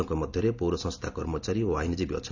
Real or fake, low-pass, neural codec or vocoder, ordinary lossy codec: real; 7.2 kHz; none; none